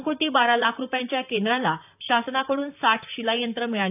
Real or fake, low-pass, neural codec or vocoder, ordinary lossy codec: fake; 3.6 kHz; vocoder, 44.1 kHz, 128 mel bands, Pupu-Vocoder; none